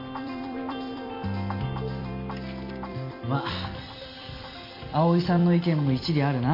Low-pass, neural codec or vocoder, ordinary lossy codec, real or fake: 5.4 kHz; vocoder, 44.1 kHz, 128 mel bands every 256 samples, BigVGAN v2; MP3, 32 kbps; fake